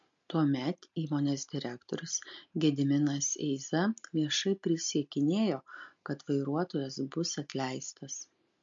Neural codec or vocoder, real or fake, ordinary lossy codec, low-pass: none; real; MP3, 48 kbps; 7.2 kHz